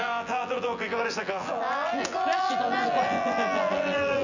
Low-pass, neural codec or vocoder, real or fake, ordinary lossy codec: 7.2 kHz; vocoder, 24 kHz, 100 mel bands, Vocos; fake; none